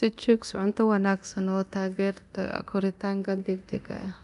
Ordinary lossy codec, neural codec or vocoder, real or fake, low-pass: none; codec, 24 kHz, 0.9 kbps, DualCodec; fake; 10.8 kHz